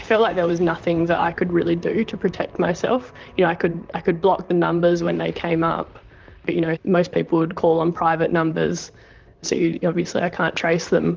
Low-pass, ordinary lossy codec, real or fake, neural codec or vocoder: 7.2 kHz; Opus, 24 kbps; fake; vocoder, 44.1 kHz, 128 mel bands, Pupu-Vocoder